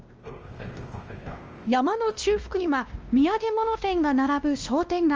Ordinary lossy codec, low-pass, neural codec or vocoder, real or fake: Opus, 24 kbps; 7.2 kHz; codec, 16 kHz, 1 kbps, X-Codec, WavLM features, trained on Multilingual LibriSpeech; fake